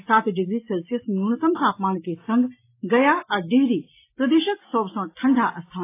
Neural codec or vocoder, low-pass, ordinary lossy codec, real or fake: none; 3.6 kHz; AAC, 24 kbps; real